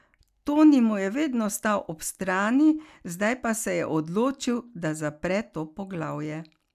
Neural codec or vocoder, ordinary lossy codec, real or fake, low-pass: none; none; real; 14.4 kHz